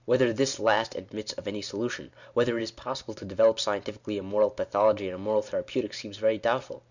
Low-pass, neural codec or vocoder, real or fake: 7.2 kHz; none; real